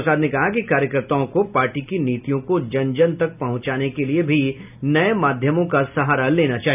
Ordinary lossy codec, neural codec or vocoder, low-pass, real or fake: none; none; 3.6 kHz; real